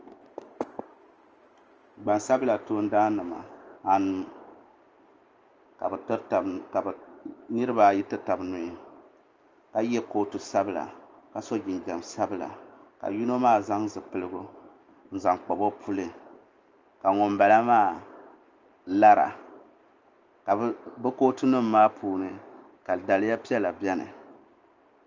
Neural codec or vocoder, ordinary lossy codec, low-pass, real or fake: none; Opus, 24 kbps; 7.2 kHz; real